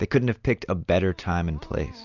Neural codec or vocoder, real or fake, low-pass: none; real; 7.2 kHz